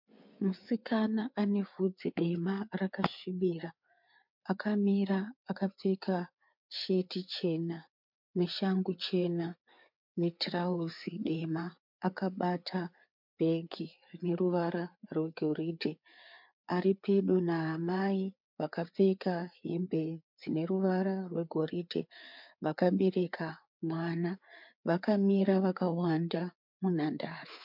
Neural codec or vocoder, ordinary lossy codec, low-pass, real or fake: codec, 16 kHz, 4 kbps, FreqCodec, larger model; MP3, 48 kbps; 5.4 kHz; fake